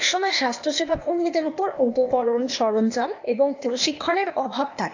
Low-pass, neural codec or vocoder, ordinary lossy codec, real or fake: 7.2 kHz; codec, 16 kHz, 0.8 kbps, ZipCodec; AAC, 48 kbps; fake